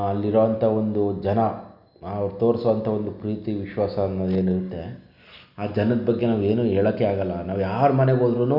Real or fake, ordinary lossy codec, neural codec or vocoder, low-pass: real; none; none; 5.4 kHz